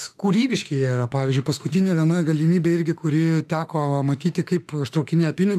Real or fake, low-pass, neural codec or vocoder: fake; 14.4 kHz; autoencoder, 48 kHz, 32 numbers a frame, DAC-VAE, trained on Japanese speech